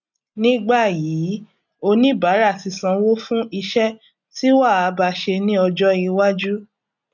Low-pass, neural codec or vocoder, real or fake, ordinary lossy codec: 7.2 kHz; none; real; none